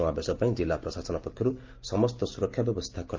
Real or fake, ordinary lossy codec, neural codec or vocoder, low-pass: real; Opus, 24 kbps; none; 7.2 kHz